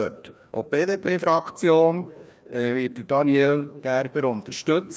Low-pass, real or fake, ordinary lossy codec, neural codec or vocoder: none; fake; none; codec, 16 kHz, 1 kbps, FreqCodec, larger model